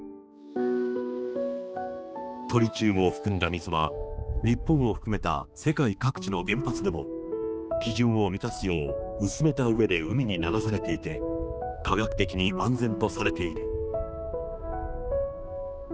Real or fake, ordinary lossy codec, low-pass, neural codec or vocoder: fake; none; none; codec, 16 kHz, 2 kbps, X-Codec, HuBERT features, trained on balanced general audio